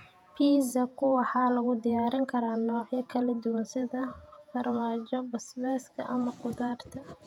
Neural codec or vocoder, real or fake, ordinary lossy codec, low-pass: vocoder, 48 kHz, 128 mel bands, Vocos; fake; none; 19.8 kHz